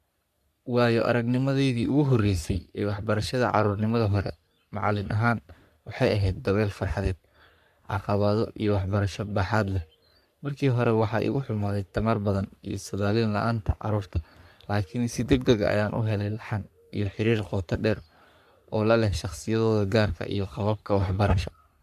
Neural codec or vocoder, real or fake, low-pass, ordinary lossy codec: codec, 44.1 kHz, 3.4 kbps, Pupu-Codec; fake; 14.4 kHz; none